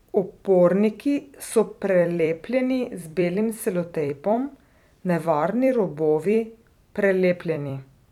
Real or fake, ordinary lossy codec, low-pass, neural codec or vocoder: fake; none; 19.8 kHz; vocoder, 44.1 kHz, 128 mel bands every 256 samples, BigVGAN v2